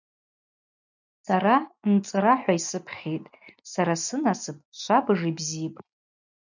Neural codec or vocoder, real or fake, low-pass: none; real; 7.2 kHz